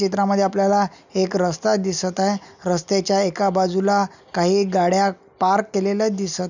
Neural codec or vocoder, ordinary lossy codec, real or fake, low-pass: none; none; real; 7.2 kHz